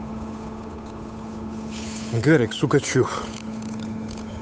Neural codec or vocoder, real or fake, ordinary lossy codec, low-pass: codec, 16 kHz, 8 kbps, FunCodec, trained on Chinese and English, 25 frames a second; fake; none; none